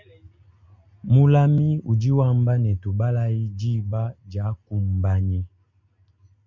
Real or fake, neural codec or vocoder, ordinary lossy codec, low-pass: real; none; MP3, 48 kbps; 7.2 kHz